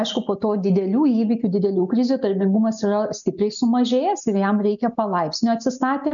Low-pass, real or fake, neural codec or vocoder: 7.2 kHz; real; none